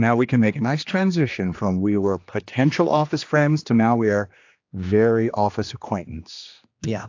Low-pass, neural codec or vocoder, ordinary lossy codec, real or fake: 7.2 kHz; codec, 16 kHz, 2 kbps, X-Codec, HuBERT features, trained on general audio; AAC, 48 kbps; fake